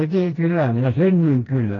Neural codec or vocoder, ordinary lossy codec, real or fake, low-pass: codec, 16 kHz, 1 kbps, FreqCodec, smaller model; AAC, 32 kbps; fake; 7.2 kHz